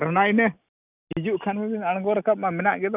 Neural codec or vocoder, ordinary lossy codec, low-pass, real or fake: none; none; 3.6 kHz; real